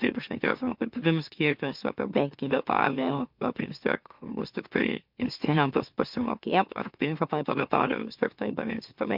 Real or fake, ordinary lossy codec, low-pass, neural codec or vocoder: fake; MP3, 48 kbps; 5.4 kHz; autoencoder, 44.1 kHz, a latent of 192 numbers a frame, MeloTTS